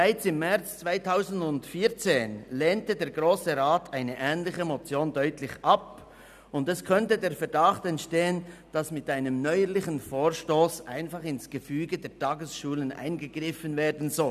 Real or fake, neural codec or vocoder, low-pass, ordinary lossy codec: real; none; 14.4 kHz; none